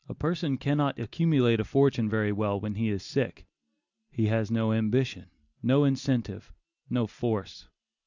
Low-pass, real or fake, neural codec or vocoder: 7.2 kHz; real; none